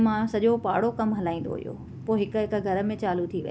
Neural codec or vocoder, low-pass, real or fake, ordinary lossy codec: none; none; real; none